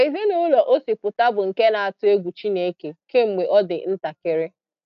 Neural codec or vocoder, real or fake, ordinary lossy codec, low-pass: none; real; none; 7.2 kHz